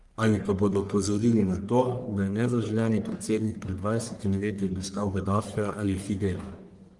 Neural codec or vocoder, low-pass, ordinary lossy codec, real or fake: codec, 44.1 kHz, 1.7 kbps, Pupu-Codec; 10.8 kHz; Opus, 24 kbps; fake